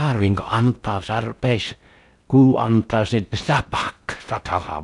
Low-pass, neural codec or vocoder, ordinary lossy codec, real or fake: 10.8 kHz; codec, 16 kHz in and 24 kHz out, 0.6 kbps, FocalCodec, streaming, 4096 codes; none; fake